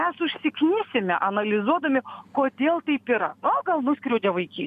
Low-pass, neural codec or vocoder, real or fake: 14.4 kHz; none; real